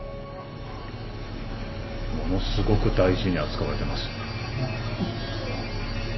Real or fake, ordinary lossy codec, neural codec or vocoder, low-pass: real; MP3, 24 kbps; none; 7.2 kHz